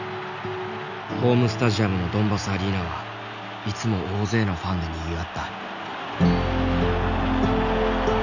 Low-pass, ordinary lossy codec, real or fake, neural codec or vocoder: 7.2 kHz; none; real; none